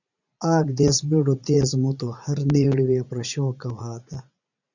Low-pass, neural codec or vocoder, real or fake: 7.2 kHz; vocoder, 44.1 kHz, 80 mel bands, Vocos; fake